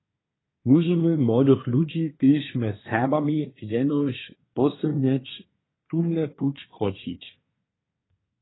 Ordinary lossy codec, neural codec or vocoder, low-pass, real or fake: AAC, 16 kbps; codec, 24 kHz, 1 kbps, SNAC; 7.2 kHz; fake